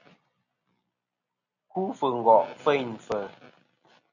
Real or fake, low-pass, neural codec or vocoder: real; 7.2 kHz; none